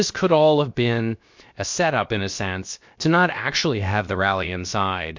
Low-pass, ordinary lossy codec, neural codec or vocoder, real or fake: 7.2 kHz; MP3, 48 kbps; codec, 16 kHz, about 1 kbps, DyCAST, with the encoder's durations; fake